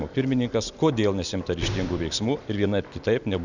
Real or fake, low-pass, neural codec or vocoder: real; 7.2 kHz; none